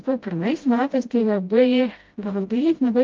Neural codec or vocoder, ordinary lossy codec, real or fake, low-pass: codec, 16 kHz, 0.5 kbps, FreqCodec, smaller model; Opus, 24 kbps; fake; 7.2 kHz